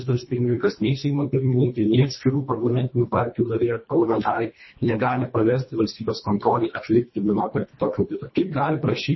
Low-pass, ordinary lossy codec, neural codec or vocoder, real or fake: 7.2 kHz; MP3, 24 kbps; codec, 24 kHz, 1.5 kbps, HILCodec; fake